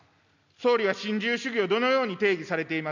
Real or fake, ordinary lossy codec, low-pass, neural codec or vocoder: real; none; 7.2 kHz; none